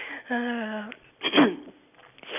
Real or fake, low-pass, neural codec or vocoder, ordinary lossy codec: real; 3.6 kHz; none; none